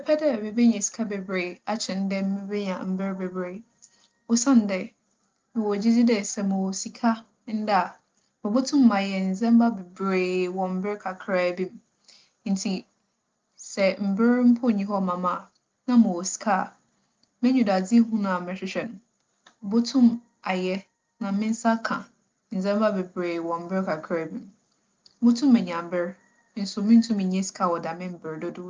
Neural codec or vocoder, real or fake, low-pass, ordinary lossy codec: none; real; 7.2 kHz; Opus, 32 kbps